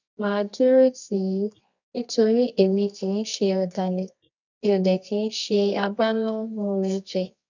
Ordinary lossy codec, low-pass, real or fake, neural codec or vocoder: none; 7.2 kHz; fake; codec, 24 kHz, 0.9 kbps, WavTokenizer, medium music audio release